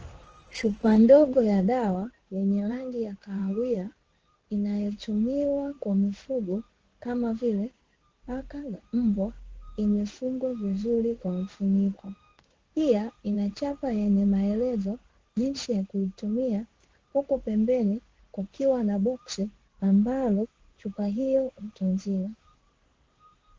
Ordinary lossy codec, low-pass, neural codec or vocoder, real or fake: Opus, 16 kbps; 7.2 kHz; codec, 16 kHz in and 24 kHz out, 1 kbps, XY-Tokenizer; fake